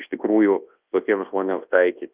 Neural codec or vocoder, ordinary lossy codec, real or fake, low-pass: codec, 24 kHz, 0.9 kbps, WavTokenizer, large speech release; Opus, 32 kbps; fake; 3.6 kHz